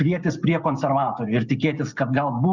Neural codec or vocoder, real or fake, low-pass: none; real; 7.2 kHz